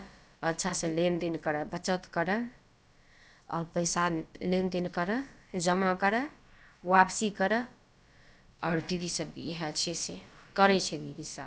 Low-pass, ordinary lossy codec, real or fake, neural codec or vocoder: none; none; fake; codec, 16 kHz, about 1 kbps, DyCAST, with the encoder's durations